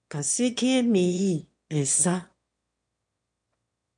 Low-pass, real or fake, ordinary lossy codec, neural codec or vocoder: 9.9 kHz; fake; MP3, 64 kbps; autoencoder, 22.05 kHz, a latent of 192 numbers a frame, VITS, trained on one speaker